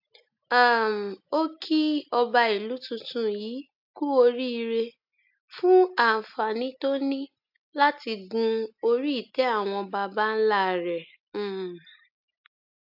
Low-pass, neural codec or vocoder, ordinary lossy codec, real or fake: 5.4 kHz; none; AAC, 48 kbps; real